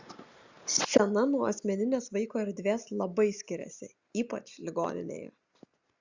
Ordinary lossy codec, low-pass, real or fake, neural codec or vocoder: Opus, 64 kbps; 7.2 kHz; real; none